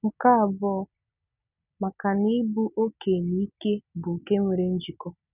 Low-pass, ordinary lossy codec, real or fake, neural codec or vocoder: 3.6 kHz; none; real; none